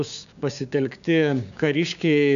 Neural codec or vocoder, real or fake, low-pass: codec, 16 kHz, 6 kbps, DAC; fake; 7.2 kHz